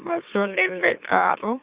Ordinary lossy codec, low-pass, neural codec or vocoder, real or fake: Opus, 64 kbps; 3.6 kHz; autoencoder, 44.1 kHz, a latent of 192 numbers a frame, MeloTTS; fake